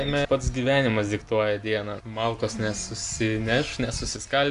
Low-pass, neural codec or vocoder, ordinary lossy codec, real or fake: 10.8 kHz; none; Opus, 64 kbps; real